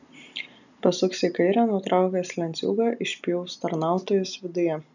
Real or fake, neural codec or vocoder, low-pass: real; none; 7.2 kHz